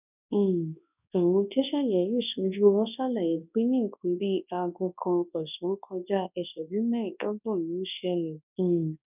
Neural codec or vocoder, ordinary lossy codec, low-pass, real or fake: codec, 24 kHz, 0.9 kbps, WavTokenizer, large speech release; none; 3.6 kHz; fake